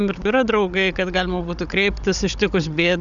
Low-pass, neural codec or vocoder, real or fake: 7.2 kHz; codec, 16 kHz, 16 kbps, FunCodec, trained on Chinese and English, 50 frames a second; fake